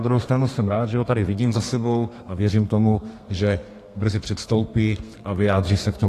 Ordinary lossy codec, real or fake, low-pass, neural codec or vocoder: AAC, 48 kbps; fake; 14.4 kHz; codec, 32 kHz, 1.9 kbps, SNAC